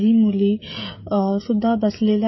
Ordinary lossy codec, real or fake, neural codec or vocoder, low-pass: MP3, 24 kbps; fake; codec, 16 kHz, 16 kbps, FreqCodec, smaller model; 7.2 kHz